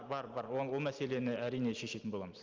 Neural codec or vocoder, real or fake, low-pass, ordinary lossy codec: none; real; 7.2 kHz; Opus, 32 kbps